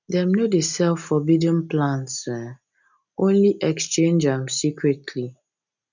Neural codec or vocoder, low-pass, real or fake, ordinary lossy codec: none; 7.2 kHz; real; none